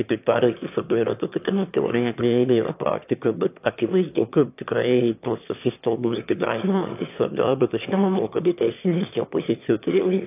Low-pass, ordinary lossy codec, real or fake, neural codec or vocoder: 3.6 kHz; AAC, 32 kbps; fake; autoencoder, 22.05 kHz, a latent of 192 numbers a frame, VITS, trained on one speaker